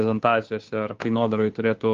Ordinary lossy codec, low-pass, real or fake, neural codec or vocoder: Opus, 32 kbps; 7.2 kHz; fake; codec, 16 kHz, 2 kbps, FunCodec, trained on Chinese and English, 25 frames a second